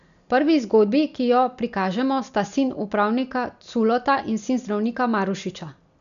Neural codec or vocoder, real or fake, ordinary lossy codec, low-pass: none; real; none; 7.2 kHz